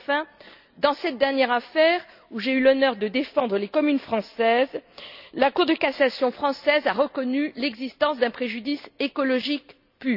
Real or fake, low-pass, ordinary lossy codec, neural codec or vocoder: real; 5.4 kHz; none; none